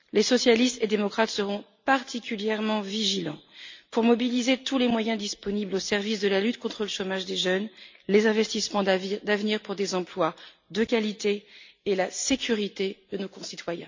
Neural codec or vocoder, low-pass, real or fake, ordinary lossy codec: none; 7.2 kHz; real; MP3, 64 kbps